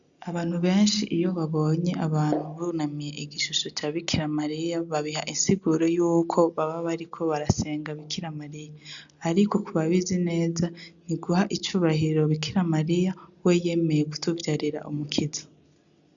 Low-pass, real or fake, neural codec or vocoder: 7.2 kHz; real; none